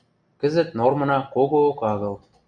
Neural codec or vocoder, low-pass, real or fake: none; 9.9 kHz; real